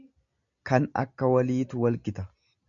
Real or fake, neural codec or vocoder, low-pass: real; none; 7.2 kHz